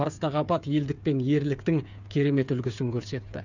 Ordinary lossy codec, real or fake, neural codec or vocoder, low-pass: none; fake; codec, 16 kHz, 8 kbps, FreqCodec, smaller model; 7.2 kHz